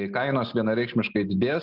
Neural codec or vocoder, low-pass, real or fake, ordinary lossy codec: none; 5.4 kHz; real; Opus, 32 kbps